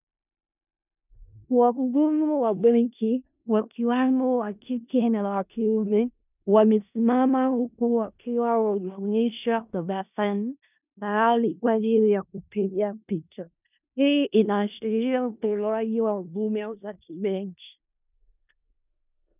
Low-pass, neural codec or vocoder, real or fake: 3.6 kHz; codec, 16 kHz in and 24 kHz out, 0.4 kbps, LongCat-Audio-Codec, four codebook decoder; fake